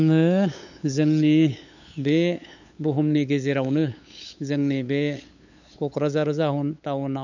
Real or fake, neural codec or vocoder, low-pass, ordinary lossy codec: fake; codec, 16 kHz, 8 kbps, FunCodec, trained on LibriTTS, 25 frames a second; 7.2 kHz; none